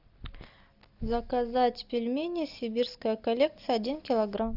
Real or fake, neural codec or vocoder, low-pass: real; none; 5.4 kHz